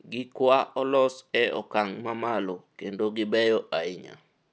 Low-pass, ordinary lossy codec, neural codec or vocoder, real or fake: none; none; none; real